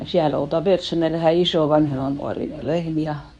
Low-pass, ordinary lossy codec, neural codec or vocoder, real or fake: 10.8 kHz; none; codec, 24 kHz, 0.9 kbps, WavTokenizer, medium speech release version 2; fake